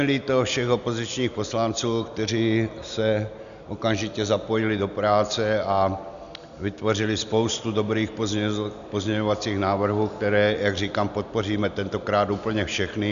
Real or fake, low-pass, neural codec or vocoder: real; 7.2 kHz; none